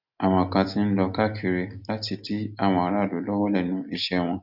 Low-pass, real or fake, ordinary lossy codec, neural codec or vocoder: 5.4 kHz; real; none; none